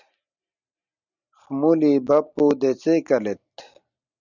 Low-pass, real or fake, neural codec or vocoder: 7.2 kHz; real; none